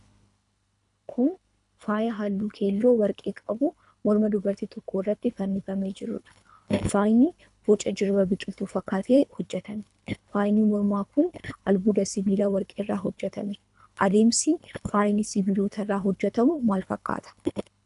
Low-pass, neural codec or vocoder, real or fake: 10.8 kHz; codec, 24 kHz, 3 kbps, HILCodec; fake